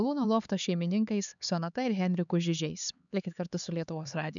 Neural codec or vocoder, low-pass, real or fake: codec, 16 kHz, 4 kbps, X-Codec, HuBERT features, trained on LibriSpeech; 7.2 kHz; fake